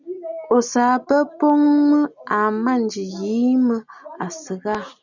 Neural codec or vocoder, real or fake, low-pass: none; real; 7.2 kHz